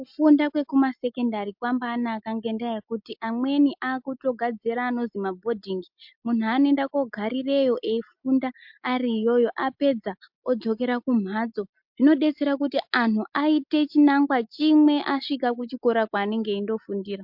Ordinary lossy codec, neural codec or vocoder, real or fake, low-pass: MP3, 48 kbps; none; real; 5.4 kHz